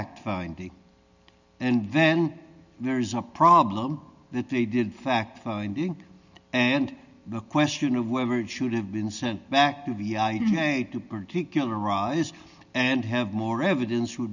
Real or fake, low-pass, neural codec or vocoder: real; 7.2 kHz; none